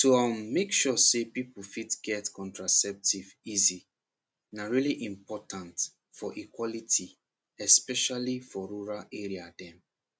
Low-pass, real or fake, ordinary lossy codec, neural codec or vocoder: none; real; none; none